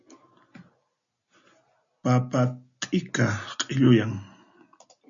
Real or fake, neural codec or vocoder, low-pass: real; none; 7.2 kHz